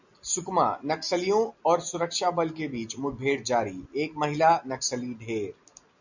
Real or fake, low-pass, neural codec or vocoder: real; 7.2 kHz; none